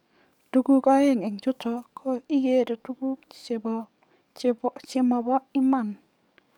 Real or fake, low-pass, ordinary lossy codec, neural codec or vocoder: fake; 19.8 kHz; none; codec, 44.1 kHz, 7.8 kbps, DAC